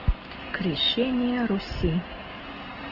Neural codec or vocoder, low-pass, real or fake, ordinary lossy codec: none; 5.4 kHz; real; Opus, 16 kbps